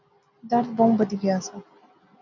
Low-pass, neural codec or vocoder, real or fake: 7.2 kHz; none; real